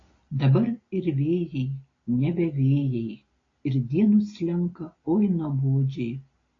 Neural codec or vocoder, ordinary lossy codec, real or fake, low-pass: none; AAC, 32 kbps; real; 7.2 kHz